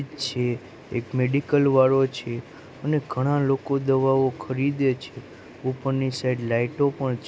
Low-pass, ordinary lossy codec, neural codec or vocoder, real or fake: none; none; none; real